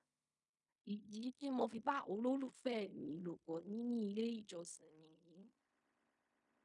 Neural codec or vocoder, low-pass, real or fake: codec, 16 kHz in and 24 kHz out, 0.4 kbps, LongCat-Audio-Codec, fine tuned four codebook decoder; 10.8 kHz; fake